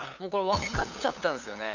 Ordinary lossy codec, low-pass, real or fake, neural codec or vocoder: none; 7.2 kHz; fake; codec, 16 kHz, 8 kbps, FunCodec, trained on LibriTTS, 25 frames a second